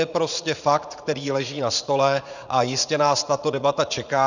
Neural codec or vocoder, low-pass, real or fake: vocoder, 44.1 kHz, 80 mel bands, Vocos; 7.2 kHz; fake